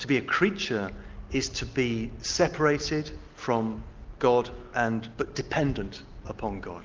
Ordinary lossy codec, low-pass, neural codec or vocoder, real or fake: Opus, 32 kbps; 7.2 kHz; none; real